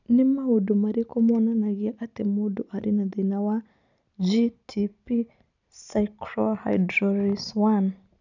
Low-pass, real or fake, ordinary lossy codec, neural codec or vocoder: 7.2 kHz; real; none; none